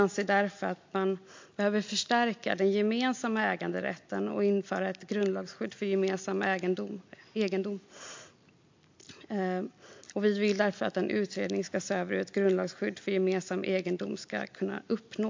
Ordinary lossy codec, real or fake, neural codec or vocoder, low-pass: MP3, 48 kbps; real; none; 7.2 kHz